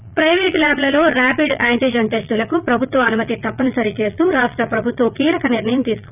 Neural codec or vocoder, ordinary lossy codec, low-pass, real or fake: vocoder, 22.05 kHz, 80 mel bands, Vocos; none; 3.6 kHz; fake